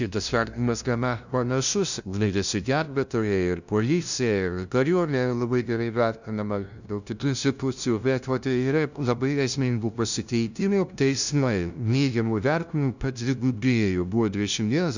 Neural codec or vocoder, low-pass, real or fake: codec, 16 kHz, 0.5 kbps, FunCodec, trained on LibriTTS, 25 frames a second; 7.2 kHz; fake